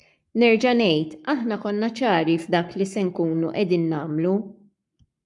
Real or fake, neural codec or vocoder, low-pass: fake; codec, 44.1 kHz, 7.8 kbps, Pupu-Codec; 10.8 kHz